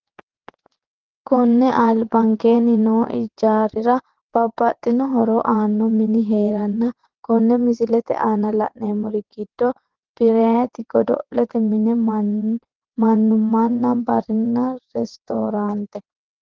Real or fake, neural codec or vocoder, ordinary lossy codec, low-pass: fake; vocoder, 22.05 kHz, 80 mel bands, WaveNeXt; Opus, 16 kbps; 7.2 kHz